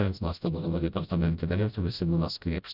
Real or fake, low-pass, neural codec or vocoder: fake; 5.4 kHz; codec, 16 kHz, 0.5 kbps, FreqCodec, smaller model